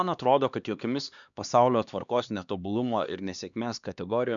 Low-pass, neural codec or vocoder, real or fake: 7.2 kHz; codec, 16 kHz, 2 kbps, X-Codec, HuBERT features, trained on LibriSpeech; fake